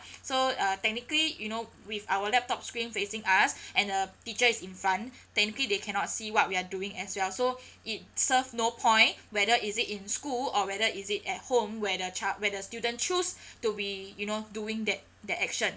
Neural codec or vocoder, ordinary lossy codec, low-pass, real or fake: none; none; none; real